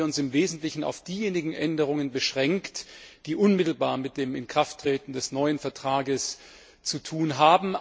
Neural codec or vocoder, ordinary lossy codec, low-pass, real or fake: none; none; none; real